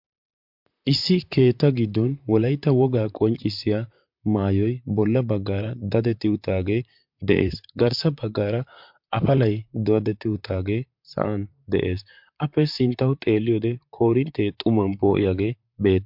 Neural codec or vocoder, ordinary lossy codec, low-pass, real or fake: vocoder, 44.1 kHz, 128 mel bands, Pupu-Vocoder; MP3, 48 kbps; 5.4 kHz; fake